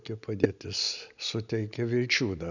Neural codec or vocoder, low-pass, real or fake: none; 7.2 kHz; real